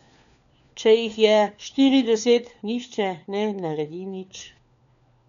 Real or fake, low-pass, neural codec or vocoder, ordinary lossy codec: fake; 7.2 kHz; codec, 16 kHz, 4 kbps, FunCodec, trained on LibriTTS, 50 frames a second; none